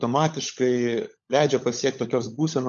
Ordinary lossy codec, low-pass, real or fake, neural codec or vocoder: MP3, 64 kbps; 7.2 kHz; fake; codec, 16 kHz, 16 kbps, FunCodec, trained on LibriTTS, 50 frames a second